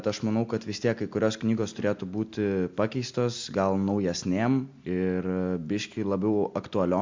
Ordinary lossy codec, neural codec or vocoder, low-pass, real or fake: MP3, 64 kbps; none; 7.2 kHz; real